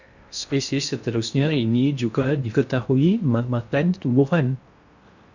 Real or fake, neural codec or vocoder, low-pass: fake; codec, 16 kHz in and 24 kHz out, 0.6 kbps, FocalCodec, streaming, 2048 codes; 7.2 kHz